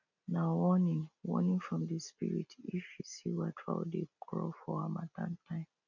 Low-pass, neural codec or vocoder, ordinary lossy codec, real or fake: 7.2 kHz; none; none; real